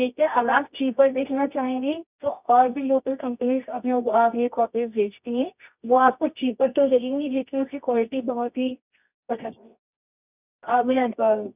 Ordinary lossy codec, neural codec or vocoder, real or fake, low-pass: none; codec, 24 kHz, 0.9 kbps, WavTokenizer, medium music audio release; fake; 3.6 kHz